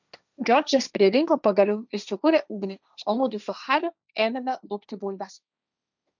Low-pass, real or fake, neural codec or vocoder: 7.2 kHz; fake; codec, 16 kHz, 1.1 kbps, Voila-Tokenizer